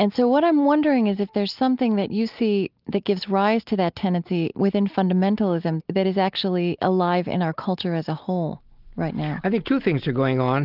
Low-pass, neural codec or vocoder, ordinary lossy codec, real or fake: 5.4 kHz; none; Opus, 32 kbps; real